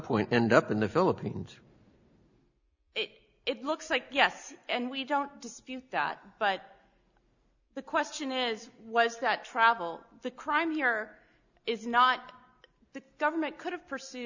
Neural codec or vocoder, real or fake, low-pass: none; real; 7.2 kHz